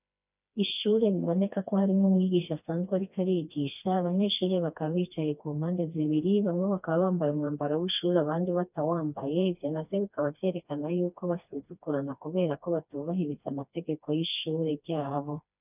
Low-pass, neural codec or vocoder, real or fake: 3.6 kHz; codec, 16 kHz, 2 kbps, FreqCodec, smaller model; fake